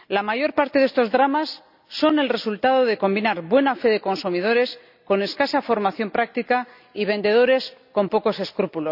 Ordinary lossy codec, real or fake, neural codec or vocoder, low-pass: none; real; none; 5.4 kHz